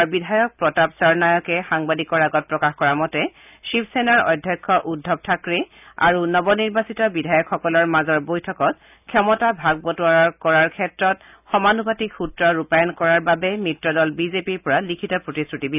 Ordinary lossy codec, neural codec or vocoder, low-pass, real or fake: none; none; 3.6 kHz; real